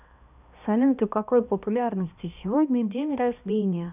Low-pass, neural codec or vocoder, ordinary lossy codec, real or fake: 3.6 kHz; codec, 16 kHz, 1 kbps, X-Codec, HuBERT features, trained on balanced general audio; none; fake